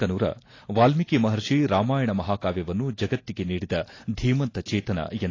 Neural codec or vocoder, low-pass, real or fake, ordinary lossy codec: none; 7.2 kHz; real; AAC, 32 kbps